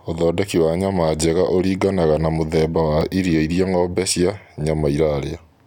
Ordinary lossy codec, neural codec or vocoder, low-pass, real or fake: none; none; 19.8 kHz; real